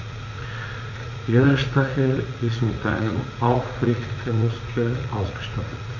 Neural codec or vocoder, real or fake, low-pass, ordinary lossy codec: vocoder, 44.1 kHz, 80 mel bands, Vocos; fake; 7.2 kHz; none